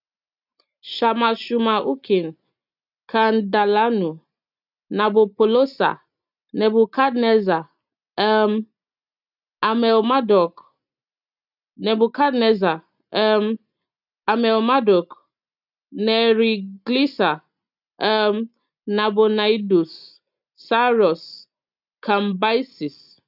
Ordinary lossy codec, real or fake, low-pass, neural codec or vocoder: none; real; 5.4 kHz; none